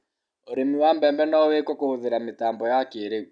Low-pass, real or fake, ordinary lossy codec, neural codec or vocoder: 9.9 kHz; real; none; none